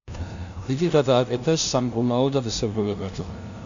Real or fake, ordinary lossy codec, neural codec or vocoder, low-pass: fake; MP3, 64 kbps; codec, 16 kHz, 0.5 kbps, FunCodec, trained on LibriTTS, 25 frames a second; 7.2 kHz